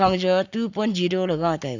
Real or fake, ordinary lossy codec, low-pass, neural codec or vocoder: fake; none; 7.2 kHz; codec, 44.1 kHz, 7.8 kbps, Pupu-Codec